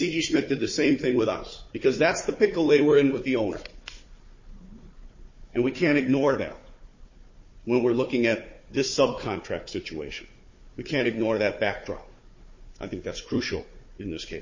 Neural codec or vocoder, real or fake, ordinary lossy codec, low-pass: codec, 16 kHz, 4 kbps, FunCodec, trained on Chinese and English, 50 frames a second; fake; MP3, 32 kbps; 7.2 kHz